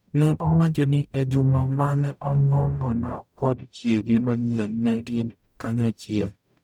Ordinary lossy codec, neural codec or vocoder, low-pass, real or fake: none; codec, 44.1 kHz, 0.9 kbps, DAC; 19.8 kHz; fake